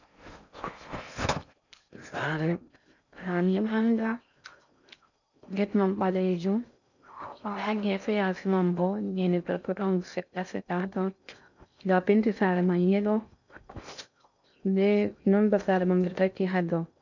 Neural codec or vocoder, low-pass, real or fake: codec, 16 kHz in and 24 kHz out, 0.6 kbps, FocalCodec, streaming, 4096 codes; 7.2 kHz; fake